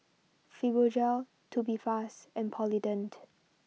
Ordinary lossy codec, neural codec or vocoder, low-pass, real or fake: none; none; none; real